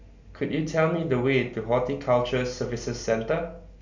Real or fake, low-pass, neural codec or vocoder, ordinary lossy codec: real; 7.2 kHz; none; none